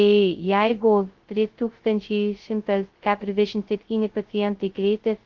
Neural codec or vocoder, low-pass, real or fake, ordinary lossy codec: codec, 16 kHz, 0.2 kbps, FocalCodec; 7.2 kHz; fake; Opus, 16 kbps